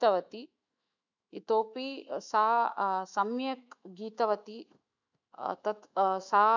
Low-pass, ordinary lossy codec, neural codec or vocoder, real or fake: 7.2 kHz; none; autoencoder, 48 kHz, 128 numbers a frame, DAC-VAE, trained on Japanese speech; fake